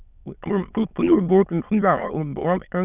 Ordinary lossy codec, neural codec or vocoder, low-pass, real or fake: none; autoencoder, 22.05 kHz, a latent of 192 numbers a frame, VITS, trained on many speakers; 3.6 kHz; fake